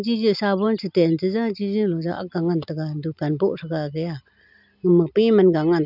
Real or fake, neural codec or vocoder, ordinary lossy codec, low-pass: real; none; none; 5.4 kHz